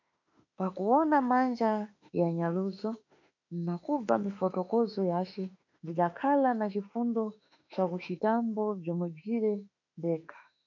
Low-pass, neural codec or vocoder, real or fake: 7.2 kHz; autoencoder, 48 kHz, 32 numbers a frame, DAC-VAE, trained on Japanese speech; fake